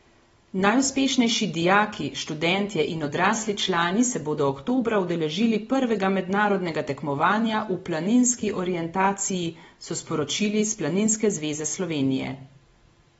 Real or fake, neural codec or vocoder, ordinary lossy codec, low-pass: real; none; AAC, 24 kbps; 19.8 kHz